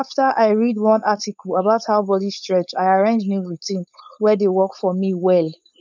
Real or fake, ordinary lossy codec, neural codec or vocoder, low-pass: fake; none; codec, 16 kHz, 4.8 kbps, FACodec; 7.2 kHz